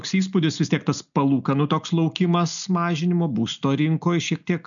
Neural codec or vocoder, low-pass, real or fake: none; 7.2 kHz; real